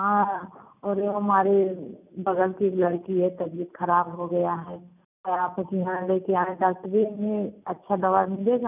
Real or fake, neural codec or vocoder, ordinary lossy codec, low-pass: real; none; none; 3.6 kHz